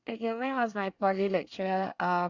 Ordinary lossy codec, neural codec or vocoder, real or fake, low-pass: Opus, 64 kbps; codec, 24 kHz, 1 kbps, SNAC; fake; 7.2 kHz